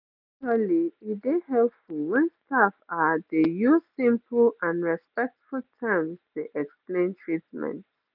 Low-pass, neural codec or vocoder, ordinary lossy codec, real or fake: 5.4 kHz; none; none; real